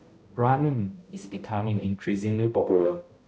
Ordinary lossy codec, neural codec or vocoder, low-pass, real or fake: none; codec, 16 kHz, 0.5 kbps, X-Codec, HuBERT features, trained on balanced general audio; none; fake